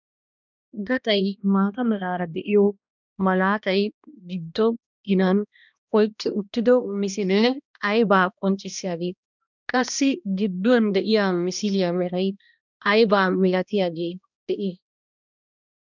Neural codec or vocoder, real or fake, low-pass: codec, 16 kHz, 1 kbps, X-Codec, HuBERT features, trained on balanced general audio; fake; 7.2 kHz